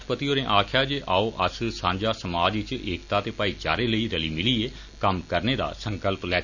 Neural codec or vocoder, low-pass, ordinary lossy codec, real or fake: none; 7.2 kHz; none; real